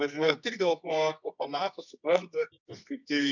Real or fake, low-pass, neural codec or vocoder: fake; 7.2 kHz; codec, 24 kHz, 0.9 kbps, WavTokenizer, medium music audio release